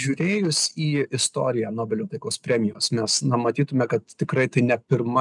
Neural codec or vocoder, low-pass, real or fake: none; 10.8 kHz; real